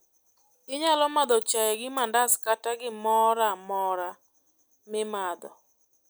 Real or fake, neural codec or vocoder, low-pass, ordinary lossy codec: real; none; none; none